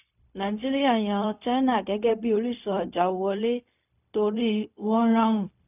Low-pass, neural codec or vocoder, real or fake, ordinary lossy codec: 3.6 kHz; codec, 16 kHz, 0.4 kbps, LongCat-Audio-Codec; fake; none